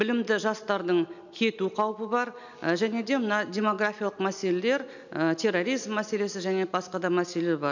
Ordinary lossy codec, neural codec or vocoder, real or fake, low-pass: none; none; real; 7.2 kHz